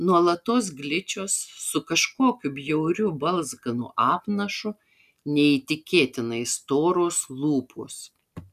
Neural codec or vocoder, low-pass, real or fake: none; 14.4 kHz; real